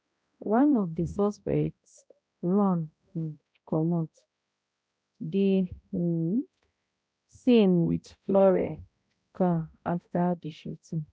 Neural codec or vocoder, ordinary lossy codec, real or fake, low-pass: codec, 16 kHz, 0.5 kbps, X-Codec, HuBERT features, trained on balanced general audio; none; fake; none